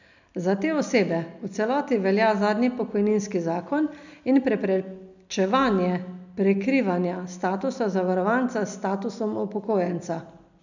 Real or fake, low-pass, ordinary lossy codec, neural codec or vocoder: real; 7.2 kHz; none; none